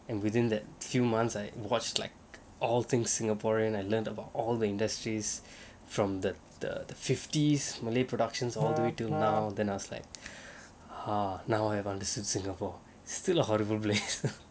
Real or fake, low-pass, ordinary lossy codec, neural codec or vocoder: real; none; none; none